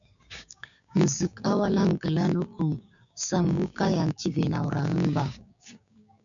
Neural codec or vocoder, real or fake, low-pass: codec, 16 kHz, 6 kbps, DAC; fake; 7.2 kHz